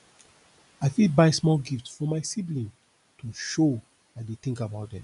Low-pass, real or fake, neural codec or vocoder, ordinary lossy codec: 10.8 kHz; real; none; none